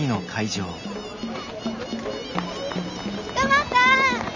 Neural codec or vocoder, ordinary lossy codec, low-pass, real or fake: none; none; 7.2 kHz; real